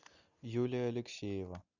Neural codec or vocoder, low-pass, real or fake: none; 7.2 kHz; real